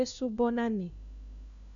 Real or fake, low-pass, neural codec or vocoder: fake; 7.2 kHz; codec, 16 kHz, 1 kbps, FunCodec, trained on LibriTTS, 50 frames a second